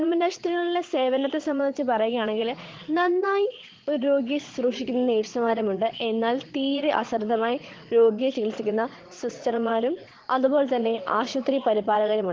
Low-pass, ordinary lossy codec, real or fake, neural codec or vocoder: 7.2 kHz; Opus, 16 kbps; fake; vocoder, 22.05 kHz, 80 mel bands, Vocos